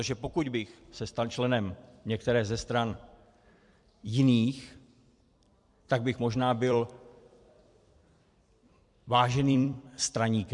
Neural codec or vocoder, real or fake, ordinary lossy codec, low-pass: vocoder, 44.1 kHz, 128 mel bands every 256 samples, BigVGAN v2; fake; MP3, 64 kbps; 10.8 kHz